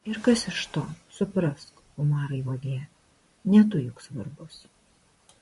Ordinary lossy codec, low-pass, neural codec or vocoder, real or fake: MP3, 48 kbps; 14.4 kHz; vocoder, 44.1 kHz, 128 mel bands, Pupu-Vocoder; fake